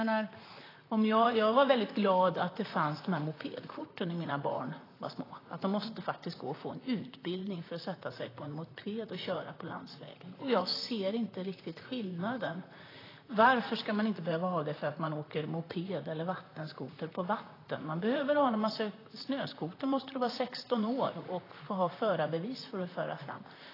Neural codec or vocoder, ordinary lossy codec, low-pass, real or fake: vocoder, 44.1 kHz, 128 mel bands, Pupu-Vocoder; AAC, 24 kbps; 5.4 kHz; fake